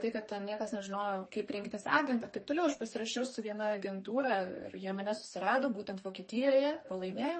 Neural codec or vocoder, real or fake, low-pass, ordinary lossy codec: codec, 32 kHz, 1.9 kbps, SNAC; fake; 10.8 kHz; MP3, 32 kbps